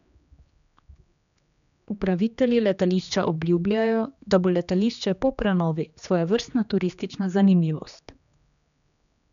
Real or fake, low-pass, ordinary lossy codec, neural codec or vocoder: fake; 7.2 kHz; none; codec, 16 kHz, 2 kbps, X-Codec, HuBERT features, trained on general audio